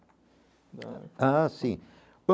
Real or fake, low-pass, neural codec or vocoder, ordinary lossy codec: real; none; none; none